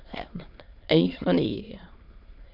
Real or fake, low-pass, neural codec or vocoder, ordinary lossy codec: fake; 5.4 kHz; autoencoder, 22.05 kHz, a latent of 192 numbers a frame, VITS, trained on many speakers; MP3, 48 kbps